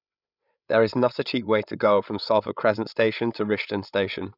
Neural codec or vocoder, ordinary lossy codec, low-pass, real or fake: codec, 16 kHz, 8 kbps, FreqCodec, larger model; none; 5.4 kHz; fake